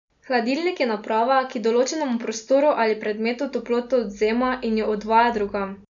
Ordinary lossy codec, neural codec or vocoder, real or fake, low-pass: none; none; real; 7.2 kHz